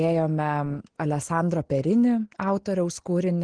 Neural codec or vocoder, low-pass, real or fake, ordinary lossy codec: none; 9.9 kHz; real; Opus, 16 kbps